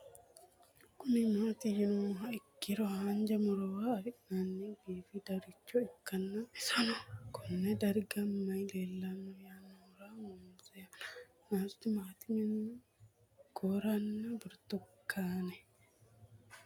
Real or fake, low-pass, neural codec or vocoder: real; 19.8 kHz; none